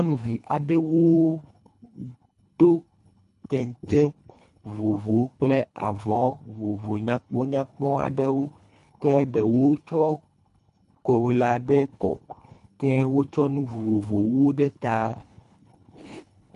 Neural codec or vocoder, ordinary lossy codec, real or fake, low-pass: codec, 24 kHz, 1.5 kbps, HILCodec; MP3, 64 kbps; fake; 10.8 kHz